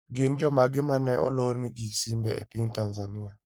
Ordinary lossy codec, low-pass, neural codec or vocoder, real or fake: none; none; codec, 44.1 kHz, 3.4 kbps, Pupu-Codec; fake